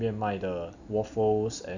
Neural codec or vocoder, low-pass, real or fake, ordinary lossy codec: none; 7.2 kHz; real; none